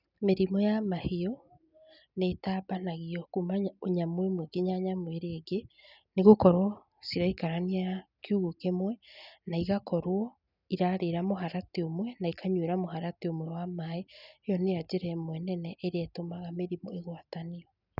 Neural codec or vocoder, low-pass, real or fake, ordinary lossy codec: none; 5.4 kHz; real; none